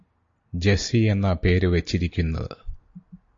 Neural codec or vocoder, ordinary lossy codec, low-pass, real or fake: none; AAC, 48 kbps; 7.2 kHz; real